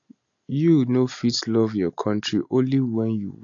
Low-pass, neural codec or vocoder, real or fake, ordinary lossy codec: 7.2 kHz; none; real; AAC, 48 kbps